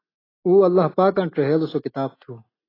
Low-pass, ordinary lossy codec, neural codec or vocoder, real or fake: 5.4 kHz; AAC, 24 kbps; none; real